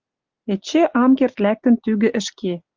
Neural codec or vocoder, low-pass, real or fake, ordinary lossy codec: none; 7.2 kHz; real; Opus, 32 kbps